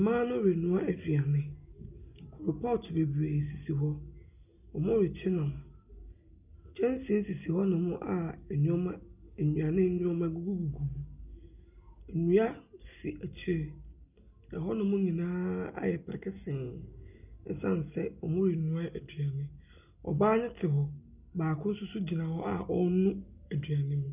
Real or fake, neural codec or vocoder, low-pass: real; none; 3.6 kHz